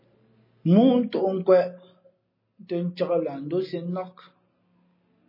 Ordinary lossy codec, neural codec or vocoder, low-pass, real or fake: MP3, 24 kbps; none; 5.4 kHz; real